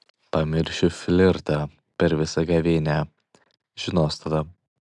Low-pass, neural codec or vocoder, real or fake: 10.8 kHz; none; real